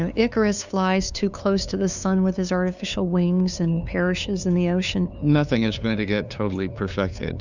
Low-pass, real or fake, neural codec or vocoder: 7.2 kHz; fake; codec, 16 kHz, 2 kbps, FunCodec, trained on LibriTTS, 25 frames a second